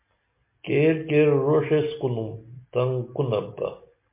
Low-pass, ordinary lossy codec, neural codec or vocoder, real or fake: 3.6 kHz; MP3, 24 kbps; none; real